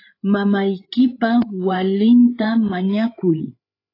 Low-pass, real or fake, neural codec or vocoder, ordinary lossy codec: 5.4 kHz; fake; codec, 16 kHz, 8 kbps, FreqCodec, larger model; AAC, 32 kbps